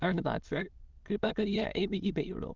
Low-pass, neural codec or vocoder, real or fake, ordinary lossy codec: 7.2 kHz; autoencoder, 22.05 kHz, a latent of 192 numbers a frame, VITS, trained on many speakers; fake; Opus, 24 kbps